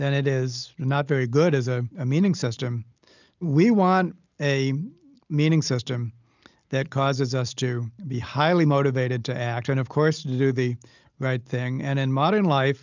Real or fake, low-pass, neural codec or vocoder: real; 7.2 kHz; none